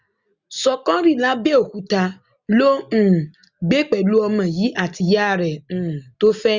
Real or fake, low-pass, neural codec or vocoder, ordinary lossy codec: real; 7.2 kHz; none; Opus, 64 kbps